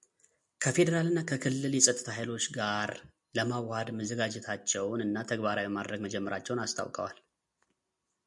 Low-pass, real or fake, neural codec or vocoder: 10.8 kHz; real; none